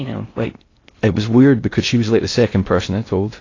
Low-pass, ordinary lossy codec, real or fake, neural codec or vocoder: 7.2 kHz; AAC, 32 kbps; fake; codec, 16 kHz in and 24 kHz out, 0.6 kbps, FocalCodec, streaming, 4096 codes